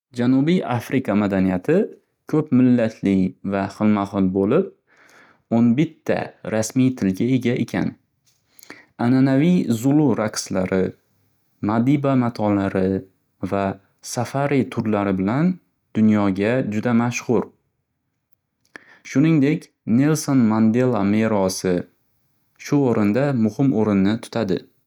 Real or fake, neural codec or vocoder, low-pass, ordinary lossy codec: real; none; 19.8 kHz; none